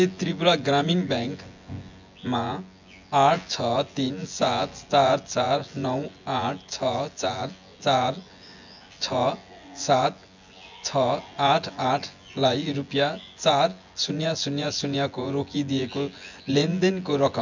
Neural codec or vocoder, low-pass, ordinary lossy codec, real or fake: vocoder, 24 kHz, 100 mel bands, Vocos; 7.2 kHz; MP3, 64 kbps; fake